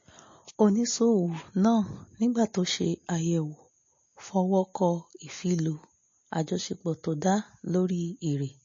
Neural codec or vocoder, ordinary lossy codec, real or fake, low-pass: none; MP3, 32 kbps; real; 7.2 kHz